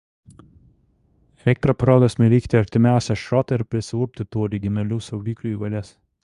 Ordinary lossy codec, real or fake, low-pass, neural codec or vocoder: Opus, 64 kbps; fake; 10.8 kHz; codec, 24 kHz, 0.9 kbps, WavTokenizer, medium speech release version 2